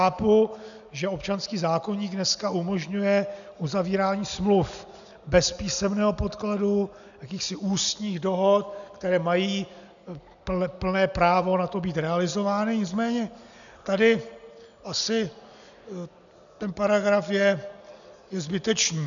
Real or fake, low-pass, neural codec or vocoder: real; 7.2 kHz; none